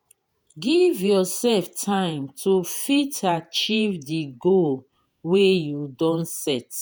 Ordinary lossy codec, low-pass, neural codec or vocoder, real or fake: none; none; vocoder, 48 kHz, 128 mel bands, Vocos; fake